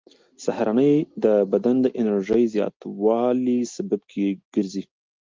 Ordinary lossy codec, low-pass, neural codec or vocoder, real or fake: Opus, 24 kbps; 7.2 kHz; none; real